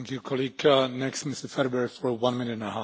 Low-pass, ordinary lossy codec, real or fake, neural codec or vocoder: none; none; real; none